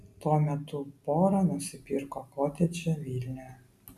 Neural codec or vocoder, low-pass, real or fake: none; 14.4 kHz; real